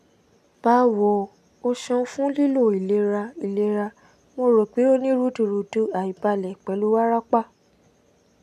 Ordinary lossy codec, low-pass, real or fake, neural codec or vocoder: none; 14.4 kHz; real; none